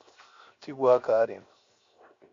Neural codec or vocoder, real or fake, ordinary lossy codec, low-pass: codec, 16 kHz, 0.7 kbps, FocalCodec; fake; MP3, 48 kbps; 7.2 kHz